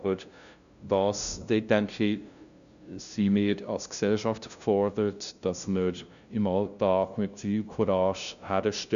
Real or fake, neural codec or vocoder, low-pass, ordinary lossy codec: fake; codec, 16 kHz, 0.5 kbps, FunCodec, trained on LibriTTS, 25 frames a second; 7.2 kHz; none